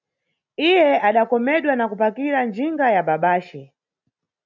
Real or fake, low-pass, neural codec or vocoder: real; 7.2 kHz; none